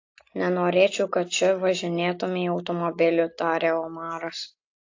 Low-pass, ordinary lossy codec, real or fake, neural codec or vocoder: 7.2 kHz; AAC, 48 kbps; real; none